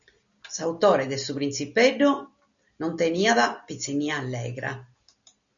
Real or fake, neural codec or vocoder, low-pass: real; none; 7.2 kHz